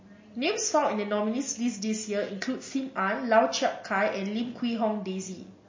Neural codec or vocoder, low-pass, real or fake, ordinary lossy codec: none; 7.2 kHz; real; MP3, 32 kbps